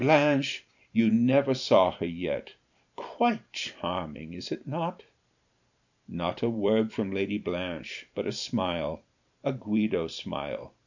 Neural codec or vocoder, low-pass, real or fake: vocoder, 44.1 kHz, 128 mel bands every 512 samples, BigVGAN v2; 7.2 kHz; fake